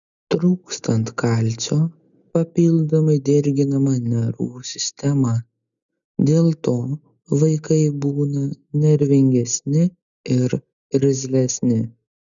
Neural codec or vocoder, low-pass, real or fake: none; 7.2 kHz; real